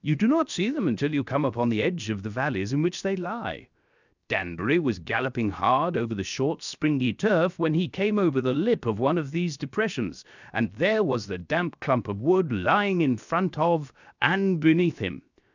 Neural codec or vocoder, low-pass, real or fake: codec, 16 kHz, 0.7 kbps, FocalCodec; 7.2 kHz; fake